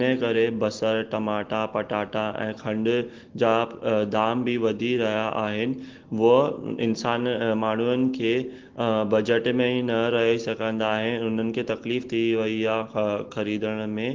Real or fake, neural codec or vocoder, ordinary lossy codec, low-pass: real; none; Opus, 16 kbps; 7.2 kHz